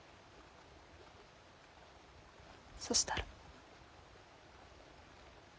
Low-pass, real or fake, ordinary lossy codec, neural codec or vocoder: none; real; none; none